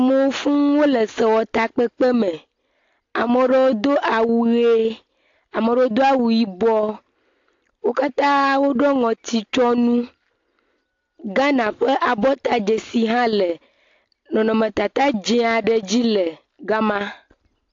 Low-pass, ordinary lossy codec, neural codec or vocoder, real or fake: 7.2 kHz; AAC, 48 kbps; none; real